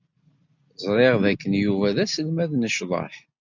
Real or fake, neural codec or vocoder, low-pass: real; none; 7.2 kHz